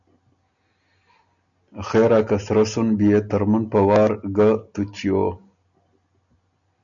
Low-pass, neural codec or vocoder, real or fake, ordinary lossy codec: 7.2 kHz; none; real; MP3, 96 kbps